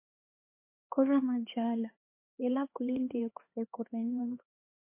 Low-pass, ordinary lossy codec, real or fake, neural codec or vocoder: 3.6 kHz; MP3, 24 kbps; fake; codec, 16 kHz, 2 kbps, X-Codec, HuBERT features, trained on LibriSpeech